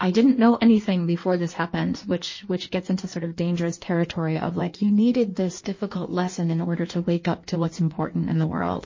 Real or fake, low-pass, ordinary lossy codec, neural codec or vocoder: fake; 7.2 kHz; MP3, 32 kbps; codec, 16 kHz in and 24 kHz out, 1.1 kbps, FireRedTTS-2 codec